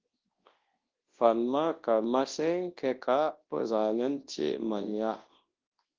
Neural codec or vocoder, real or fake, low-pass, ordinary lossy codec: codec, 24 kHz, 0.9 kbps, WavTokenizer, large speech release; fake; 7.2 kHz; Opus, 16 kbps